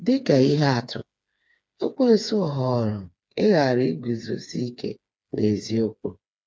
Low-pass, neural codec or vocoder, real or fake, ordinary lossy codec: none; codec, 16 kHz, 4 kbps, FreqCodec, smaller model; fake; none